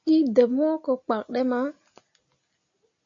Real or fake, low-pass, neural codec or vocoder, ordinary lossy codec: real; 7.2 kHz; none; MP3, 48 kbps